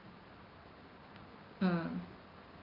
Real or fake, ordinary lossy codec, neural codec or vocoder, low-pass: real; Opus, 24 kbps; none; 5.4 kHz